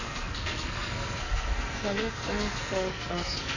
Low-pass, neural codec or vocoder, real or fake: 7.2 kHz; codec, 44.1 kHz, 2.6 kbps, SNAC; fake